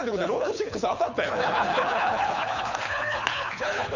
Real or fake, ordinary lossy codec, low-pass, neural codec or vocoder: fake; none; 7.2 kHz; codec, 24 kHz, 6 kbps, HILCodec